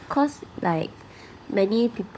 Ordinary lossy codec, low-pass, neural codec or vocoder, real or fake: none; none; codec, 16 kHz, 16 kbps, FunCodec, trained on LibriTTS, 50 frames a second; fake